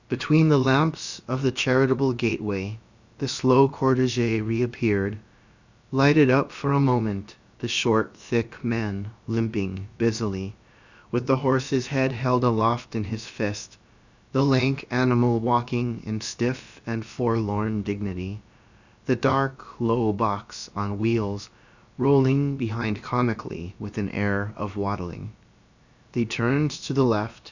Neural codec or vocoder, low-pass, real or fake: codec, 16 kHz, about 1 kbps, DyCAST, with the encoder's durations; 7.2 kHz; fake